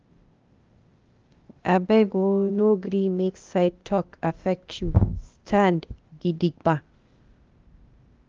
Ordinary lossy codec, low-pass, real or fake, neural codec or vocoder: Opus, 24 kbps; 7.2 kHz; fake; codec, 16 kHz, 0.8 kbps, ZipCodec